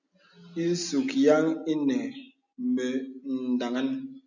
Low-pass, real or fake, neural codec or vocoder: 7.2 kHz; real; none